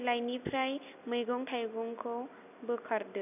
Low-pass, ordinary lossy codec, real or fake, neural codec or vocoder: 3.6 kHz; none; real; none